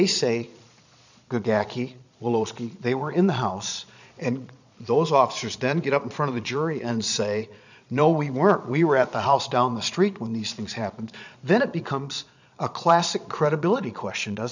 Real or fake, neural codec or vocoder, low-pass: fake; vocoder, 22.05 kHz, 80 mel bands, Vocos; 7.2 kHz